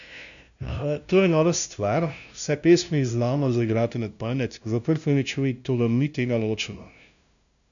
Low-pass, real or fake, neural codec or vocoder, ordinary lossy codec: 7.2 kHz; fake; codec, 16 kHz, 0.5 kbps, FunCodec, trained on LibriTTS, 25 frames a second; none